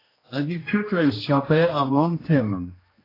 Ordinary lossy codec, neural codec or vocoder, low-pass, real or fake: AAC, 24 kbps; codec, 16 kHz, 1 kbps, X-Codec, HuBERT features, trained on general audio; 5.4 kHz; fake